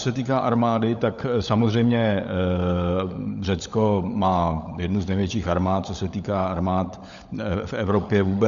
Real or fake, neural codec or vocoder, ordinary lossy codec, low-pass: fake; codec, 16 kHz, 16 kbps, FunCodec, trained on LibriTTS, 50 frames a second; MP3, 96 kbps; 7.2 kHz